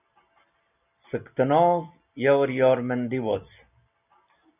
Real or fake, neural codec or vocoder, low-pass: real; none; 3.6 kHz